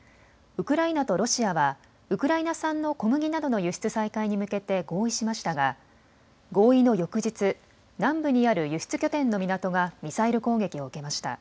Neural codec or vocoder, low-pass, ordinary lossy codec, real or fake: none; none; none; real